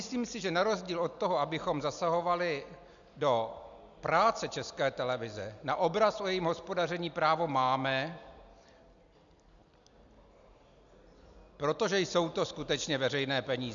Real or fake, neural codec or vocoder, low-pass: real; none; 7.2 kHz